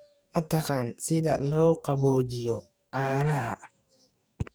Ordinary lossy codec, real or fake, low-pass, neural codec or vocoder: none; fake; none; codec, 44.1 kHz, 2.6 kbps, DAC